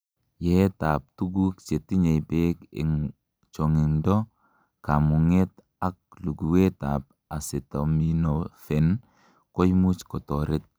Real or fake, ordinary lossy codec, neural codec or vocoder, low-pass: real; none; none; none